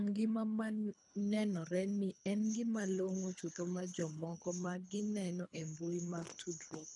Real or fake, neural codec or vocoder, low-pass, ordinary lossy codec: fake; codec, 24 kHz, 3 kbps, HILCodec; 10.8 kHz; none